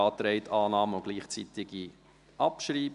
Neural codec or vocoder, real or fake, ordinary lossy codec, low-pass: none; real; none; 10.8 kHz